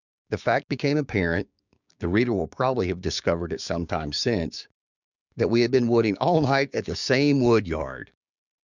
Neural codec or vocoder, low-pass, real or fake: codec, 16 kHz, 6 kbps, DAC; 7.2 kHz; fake